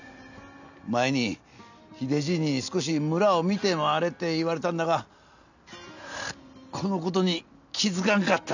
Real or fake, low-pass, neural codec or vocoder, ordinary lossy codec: real; 7.2 kHz; none; none